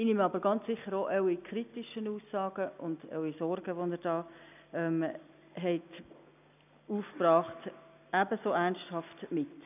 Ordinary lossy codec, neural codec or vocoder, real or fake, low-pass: none; none; real; 3.6 kHz